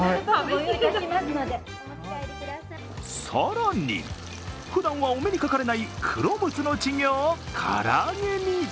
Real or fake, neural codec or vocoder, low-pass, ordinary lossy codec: real; none; none; none